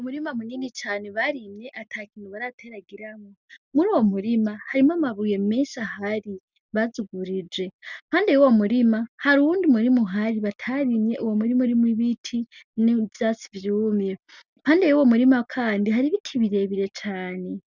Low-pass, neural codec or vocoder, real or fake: 7.2 kHz; none; real